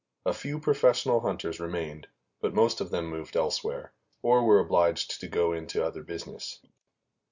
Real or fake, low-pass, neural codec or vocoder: real; 7.2 kHz; none